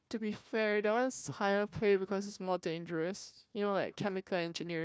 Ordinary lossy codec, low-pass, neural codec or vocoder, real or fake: none; none; codec, 16 kHz, 1 kbps, FunCodec, trained on Chinese and English, 50 frames a second; fake